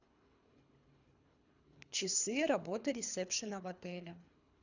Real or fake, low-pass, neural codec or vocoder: fake; 7.2 kHz; codec, 24 kHz, 3 kbps, HILCodec